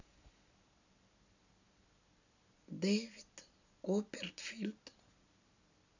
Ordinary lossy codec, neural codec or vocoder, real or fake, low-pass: none; none; real; 7.2 kHz